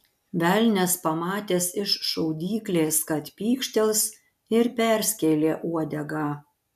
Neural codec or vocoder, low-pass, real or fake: none; 14.4 kHz; real